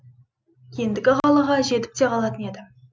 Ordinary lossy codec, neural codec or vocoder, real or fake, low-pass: none; none; real; none